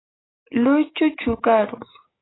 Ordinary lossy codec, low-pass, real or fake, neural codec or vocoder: AAC, 16 kbps; 7.2 kHz; fake; codec, 16 kHz, 6 kbps, DAC